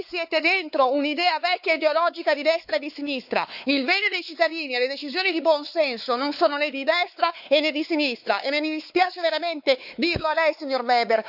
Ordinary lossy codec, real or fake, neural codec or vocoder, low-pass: none; fake; codec, 16 kHz, 4 kbps, X-Codec, WavLM features, trained on Multilingual LibriSpeech; 5.4 kHz